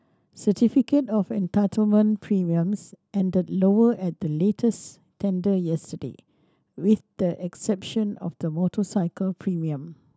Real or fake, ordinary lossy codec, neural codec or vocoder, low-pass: real; none; none; none